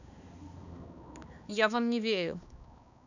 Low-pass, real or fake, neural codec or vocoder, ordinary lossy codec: 7.2 kHz; fake; codec, 16 kHz, 2 kbps, X-Codec, HuBERT features, trained on balanced general audio; none